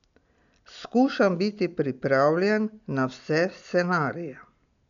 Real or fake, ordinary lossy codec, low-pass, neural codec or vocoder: real; none; 7.2 kHz; none